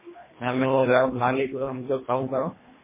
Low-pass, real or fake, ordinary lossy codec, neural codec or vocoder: 3.6 kHz; fake; MP3, 16 kbps; codec, 24 kHz, 1.5 kbps, HILCodec